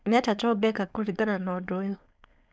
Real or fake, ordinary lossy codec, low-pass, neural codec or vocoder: fake; none; none; codec, 16 kHz, 4.8 kbps, FACodec